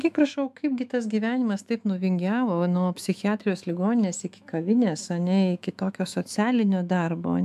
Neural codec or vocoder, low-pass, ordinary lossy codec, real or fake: autoencoder, 48 kHz, 128 numbers a frame, DAC-VAE, trained on Japanese speech; 14.4 kHz; MP3, 96 kbps; fake